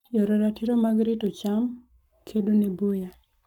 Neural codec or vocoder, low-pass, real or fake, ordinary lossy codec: codec, 44.1 kHz, 7.8 kbps, Pupu-Codec; 19.8 kHz; fake; none